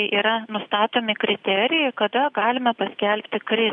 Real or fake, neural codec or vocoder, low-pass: real; none; 10.8 kHz